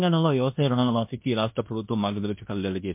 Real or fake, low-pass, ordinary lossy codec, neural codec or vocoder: fake; 3.6 kHz; MP3, 32 kbps; codec, 16 kHz in and 24 kHz out, 0.9 kbps, LongCat-Audio-Codec, fine tuned four codebook decoder